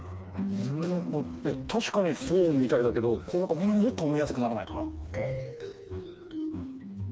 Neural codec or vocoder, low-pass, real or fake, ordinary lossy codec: codec, 16 kHz, 2 kbps, FreqCodec, smaller model; none; fake; none